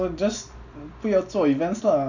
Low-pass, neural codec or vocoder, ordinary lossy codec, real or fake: 7.2 kHz; none; none; real